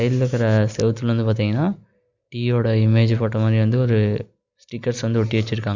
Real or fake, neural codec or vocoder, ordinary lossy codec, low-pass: real; none; Opus, 64 kbps; 7.2 kHz